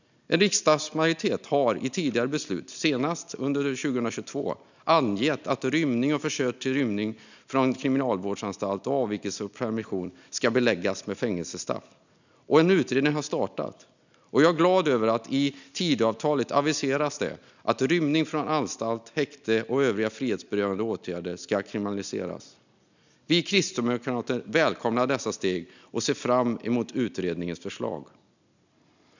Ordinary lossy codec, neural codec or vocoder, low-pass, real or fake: none; none; 7.2 kHz; real